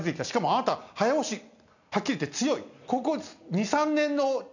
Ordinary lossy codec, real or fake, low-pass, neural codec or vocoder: none; real; 7.2 kHz; none